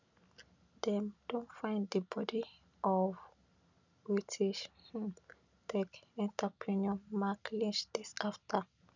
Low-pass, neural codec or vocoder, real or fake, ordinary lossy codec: 7.2 kHz; none; real; none